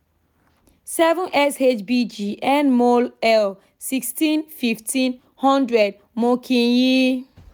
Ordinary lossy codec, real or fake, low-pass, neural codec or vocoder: none; real; none; none